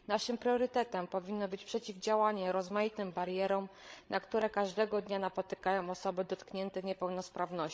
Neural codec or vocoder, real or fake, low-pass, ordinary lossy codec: codec, 16 kHz, 16 kbps, FreqCodec, larger model; fake; none; none